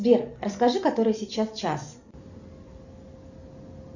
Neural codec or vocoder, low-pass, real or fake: none; 7.2 kHz; real